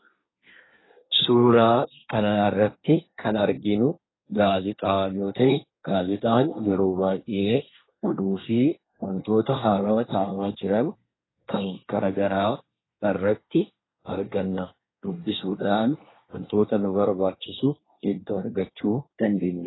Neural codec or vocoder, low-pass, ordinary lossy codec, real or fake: codec, 24 kHz, 1 kbps, SNAC; 7.2 kHz; AAC, 16 kbps; fake